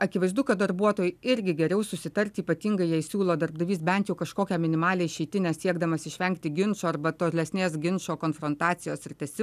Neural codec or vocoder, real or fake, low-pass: none; real; 14.4 kHz